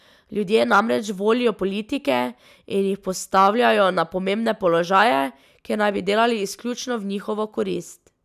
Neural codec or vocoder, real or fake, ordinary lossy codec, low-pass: none; real; none; 14.4 kHz